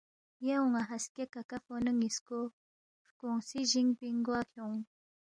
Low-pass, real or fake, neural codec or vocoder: 9.9 kHz; real; none